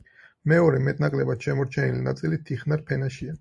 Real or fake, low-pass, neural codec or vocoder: fake; 10.8 kHz; vocoder, 44.1 kHz, 128 mel bands every 512 samples, BigVGAN v2